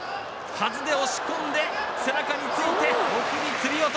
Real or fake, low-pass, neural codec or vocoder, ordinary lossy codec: real; none; none; none